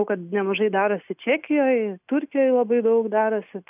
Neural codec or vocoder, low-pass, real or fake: none; 3.6 kHz; real